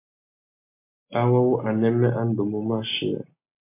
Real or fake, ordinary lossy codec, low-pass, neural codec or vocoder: real; MP3, 24 kbps; 3.6 kHz; none